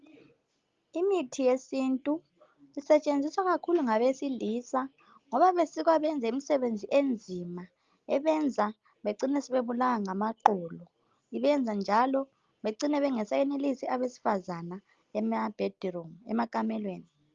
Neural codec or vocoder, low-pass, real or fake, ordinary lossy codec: none; 7.2 kHz; real; Opus, 32 kbps